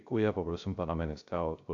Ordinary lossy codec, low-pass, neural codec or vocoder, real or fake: AAC, 64 kbps; 7.2 kHz; codec, 16 kHz, 0.3 kbps, FocalCodec; fake